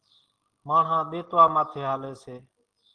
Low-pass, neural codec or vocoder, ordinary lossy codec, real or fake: 9.9 kHz; none; Opus, 16 kbps; real